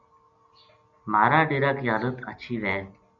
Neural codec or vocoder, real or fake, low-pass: none; real; 7.2 kHz